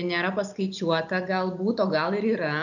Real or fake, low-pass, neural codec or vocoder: real; 7.2 kHz; none